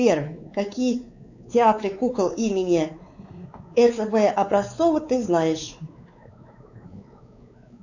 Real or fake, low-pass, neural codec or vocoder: fake; 7.2 kHz; codec, 16 kHz, 4 kbps, X-Codec, WavLM features, trained on Multilingual LibriSpeech